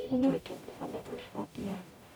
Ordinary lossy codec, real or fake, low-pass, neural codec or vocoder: none; fake; none; codec, 44.1 kHz, 0.9 kbps, DAC